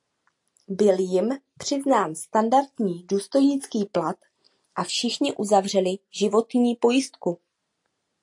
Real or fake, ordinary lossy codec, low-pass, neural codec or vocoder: real; AAC, 64 kbps; 10.8 kHz; none